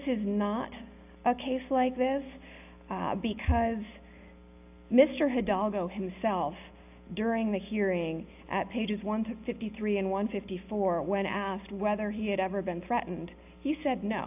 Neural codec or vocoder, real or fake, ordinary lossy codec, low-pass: none; real; AAC, 32 kbps; 3.6 kHz